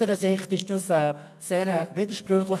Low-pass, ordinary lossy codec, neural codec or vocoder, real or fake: none; none; codec, 24 kHz, 0.9 kbps, WavTokenizer, medium music audio release; fake